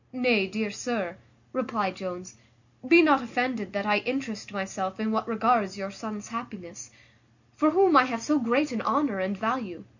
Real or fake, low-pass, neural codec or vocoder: real; 7.2 kHz; none